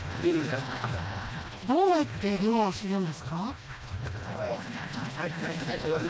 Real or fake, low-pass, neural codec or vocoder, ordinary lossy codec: fake; none; codec, 16 kHz, 1 kbps, FreqCodec, smaller model; none